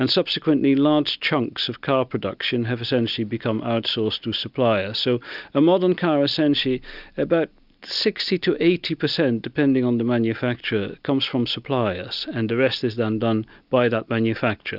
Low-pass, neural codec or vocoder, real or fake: 5.4 kHz; none; real